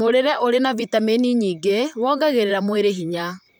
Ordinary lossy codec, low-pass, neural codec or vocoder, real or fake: none; none; vocoder, 44.1 kHz, 128 mel bands, Pupu-Vocoder; fake